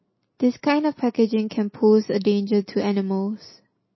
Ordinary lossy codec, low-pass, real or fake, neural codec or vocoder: MP3, 24 kbps; 7.2 kHz; real; none